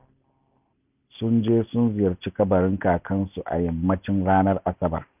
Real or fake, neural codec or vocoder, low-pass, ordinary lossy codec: real; none; 3.6 kHz; none